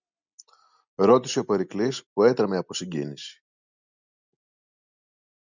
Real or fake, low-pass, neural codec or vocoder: real; 7.2 kHz; none